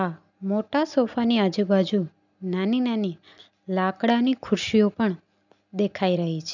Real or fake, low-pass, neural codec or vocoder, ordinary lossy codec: real; 7.2 kHz; none; none